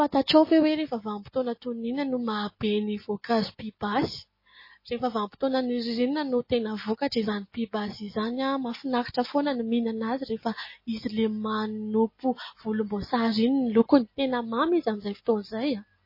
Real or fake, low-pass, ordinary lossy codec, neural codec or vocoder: real; 5.4 kHz; MP3, 24 kbps; none